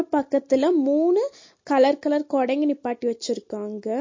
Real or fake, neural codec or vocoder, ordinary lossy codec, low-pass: real; none; MP3, 32 kbps; 7.2 kHz